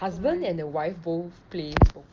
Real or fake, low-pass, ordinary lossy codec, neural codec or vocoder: real; 7.2 kHz; Opus, 24 kbps; none